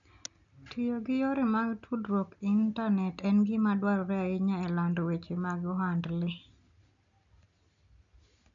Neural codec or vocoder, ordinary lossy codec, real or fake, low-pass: none; none; real; 7.2 kHz